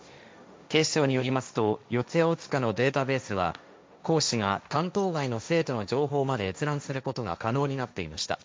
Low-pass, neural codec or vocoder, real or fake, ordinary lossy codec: none; codec, 16 kHz, 1.1 kbps, Voila-Tokenizer; fake; none